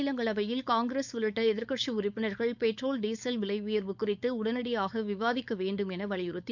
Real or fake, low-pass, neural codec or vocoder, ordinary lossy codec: fake; 7.2 kHz; codec, 16 kHz, 4.8 kbps, FACodec; none